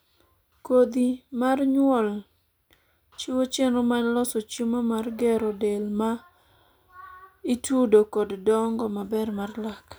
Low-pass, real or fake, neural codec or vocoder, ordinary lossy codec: none; real; none; none